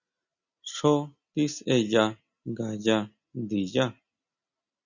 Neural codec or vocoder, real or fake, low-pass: none; real; 7.2 kHz